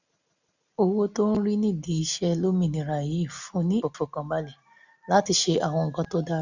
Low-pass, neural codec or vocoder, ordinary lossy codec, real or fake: 7.2 kHz; none; none; real